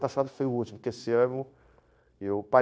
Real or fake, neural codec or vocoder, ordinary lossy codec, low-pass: fake; codec, 16 kHz, 0.9 kbps, LongCat-Audio-Codec; none; none